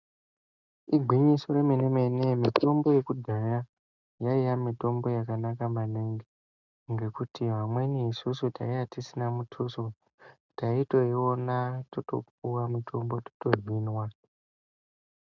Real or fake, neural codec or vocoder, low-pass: real; none; 7.2 kHz